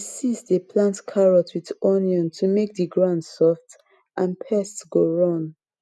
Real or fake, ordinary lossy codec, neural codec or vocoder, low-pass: real; none; none; none